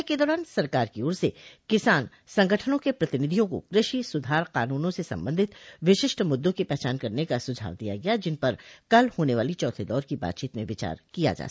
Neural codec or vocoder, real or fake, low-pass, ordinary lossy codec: none; real; none; none